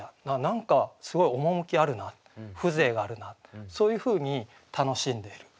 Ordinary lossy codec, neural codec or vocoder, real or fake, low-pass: none; none; real; none